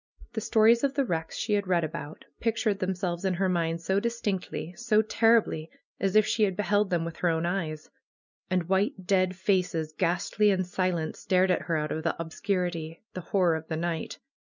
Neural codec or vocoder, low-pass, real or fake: none; 7.2 kHz; real